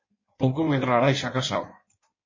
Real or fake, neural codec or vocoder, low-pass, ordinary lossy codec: fake; codec, 16 kHz in and 24 kHz out, 1.1 kbps, FireRedTTS-2 codec; 7.2 kHz; MP3, 32 kbps